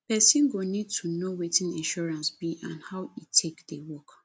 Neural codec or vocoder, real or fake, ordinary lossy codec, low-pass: none; real; none; none